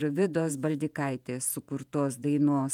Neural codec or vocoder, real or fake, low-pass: vocoder, 48 kHz, 128 mel bands, Vocos; fake; 19.8 kHz